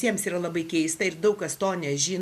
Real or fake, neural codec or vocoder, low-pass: real; none; 14.4 kHz